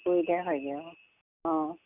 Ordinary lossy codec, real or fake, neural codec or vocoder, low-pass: Opus, 64 kbps; real; none; 3.6 kHz